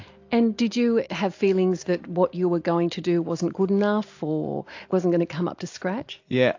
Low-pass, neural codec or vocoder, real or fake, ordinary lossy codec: 7.2 kHz; none; real; AAC, 48 kbps